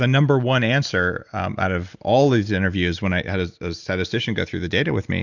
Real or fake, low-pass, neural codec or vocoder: real; 7.2 kHz; none